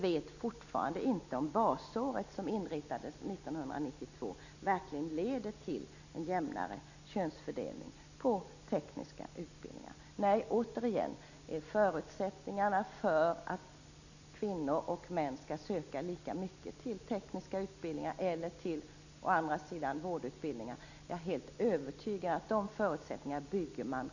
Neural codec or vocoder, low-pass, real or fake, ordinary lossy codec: none; 7.2 kHz; real; none